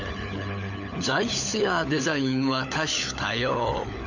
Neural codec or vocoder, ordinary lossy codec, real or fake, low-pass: codec, 16 kHz, 16 kbps, FunCodec, trained on LibriTTS, 50 frames a second; none; fake; 7.2 kHz